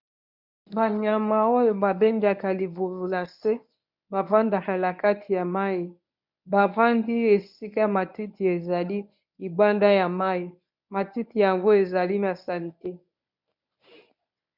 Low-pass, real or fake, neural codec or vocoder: 5.4 kHz; fake; codec, 24 kHz, 0.9 kbps, WavTokenizer, medium speech release version 1